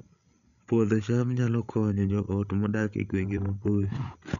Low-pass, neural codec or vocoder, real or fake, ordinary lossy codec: 7.2 kHz; codec, 16 kHz, 8 kbps, FreqCodec, larger model; fake; none